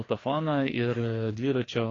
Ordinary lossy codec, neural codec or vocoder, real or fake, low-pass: AAC, 32 kbps; codec, 16 kHz, 2 kbps, FreqCodec, larger model; fake; 7.2 kHz